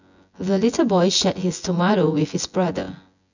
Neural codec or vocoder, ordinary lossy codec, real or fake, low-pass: vocoder, 24 kHz, 100 mel bands, Vocos; none; fake; 7.2 kHz